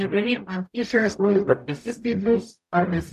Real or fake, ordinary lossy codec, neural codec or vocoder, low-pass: fake; MP3, 96 kbps; codec, 44.1 kHz, 0.9 kbps, DAC; 14.4 kHz